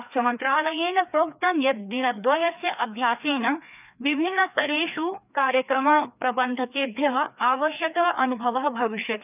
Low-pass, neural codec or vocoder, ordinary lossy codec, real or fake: 3.6 kHz; codec, 16 kHz, 2 kbps, FreqCodec, larger model; none; fake